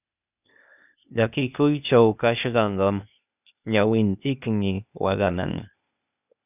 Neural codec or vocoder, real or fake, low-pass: codec, 16 kHz, 0.8 kbps, ZipCodec; fake; 3.6 kHz